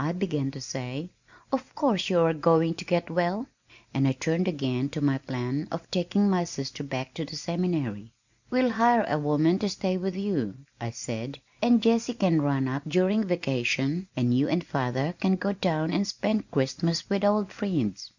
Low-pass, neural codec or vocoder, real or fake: 7.2 kHz; none; real